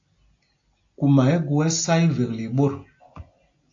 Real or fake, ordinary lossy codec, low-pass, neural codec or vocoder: real; AAC, 48 kbps; 7.2 kHz; none